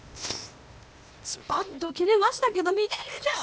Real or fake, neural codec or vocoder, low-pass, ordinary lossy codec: fake; codec, 16 kHz, 0.8 kbps, ZipCodec; none; none